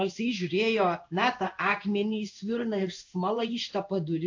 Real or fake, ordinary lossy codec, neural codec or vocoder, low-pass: fake; AAC, 48 kbps; codec, 16 kHz in and 24 kHz out, 1 kbps, XY-Tokenizer; 7.2 kHz